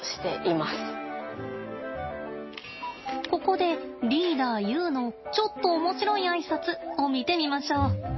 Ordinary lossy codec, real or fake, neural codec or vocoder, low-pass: MP3, 24 kbps; real; none; 7.2 kHz